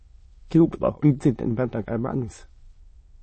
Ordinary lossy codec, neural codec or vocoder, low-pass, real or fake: MP3, 32 kbps; autoencoder, 22.05 kHz, a latent of 192 numbers a frame, VITS, trained on many speakers; 9.9 kHz; fake